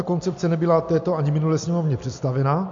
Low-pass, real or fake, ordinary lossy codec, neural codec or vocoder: 7.2 kHz; real; MP3, 48 kbps; none